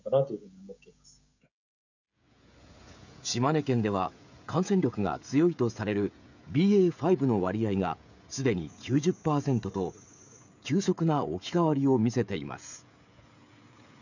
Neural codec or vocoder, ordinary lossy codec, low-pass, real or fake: codec, 16 kHz, 16 kbps, FreqCodec, smaller model; none; 7.2 kHz; fake